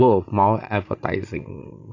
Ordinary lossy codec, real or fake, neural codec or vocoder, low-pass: AAC, 48 kbps; fake; vocoder, 44.1 kHz, 80 mel bands, Vocos; 7.2 kHz